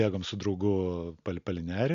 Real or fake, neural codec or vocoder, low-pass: real; none; 7.2 kHz